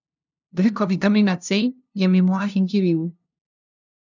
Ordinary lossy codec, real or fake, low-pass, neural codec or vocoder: none; fake; 7.2 kHz; codec, 16 kHz, 0.5 kbps, FunCodec, trained on LibriTTS, 25 frames a second